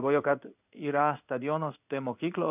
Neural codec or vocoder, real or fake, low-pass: codec, 16 kHz in and 24 kHz out, 1 kbps, XY-Tokenizer; fake; 3.6 kHz